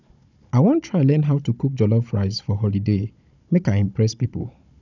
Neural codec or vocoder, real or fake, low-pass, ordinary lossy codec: codec, 16 kHz, 16 kbps, FunCodec, trained on Chinese and English, 50 frames a second; fake; 7.2 kHz; none